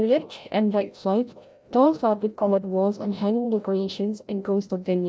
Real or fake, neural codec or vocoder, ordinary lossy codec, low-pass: fake; codec, 16 kHz, 0.5 kbps, FreqCodec, larger model; none; none